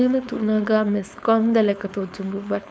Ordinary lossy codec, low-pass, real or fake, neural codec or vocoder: none; none; fake; codec, 16 kHz, 4.8 kbps, FACodec